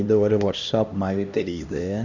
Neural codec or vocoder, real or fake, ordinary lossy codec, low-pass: codec, 16 kHz, 1 kbps, X-Codec, HuBERT features, trained on LibriSpeech; fake; none; 7.2 kHz